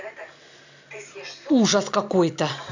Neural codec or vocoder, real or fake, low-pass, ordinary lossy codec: none; real; 7.2 kHz; AAC, 48 kbps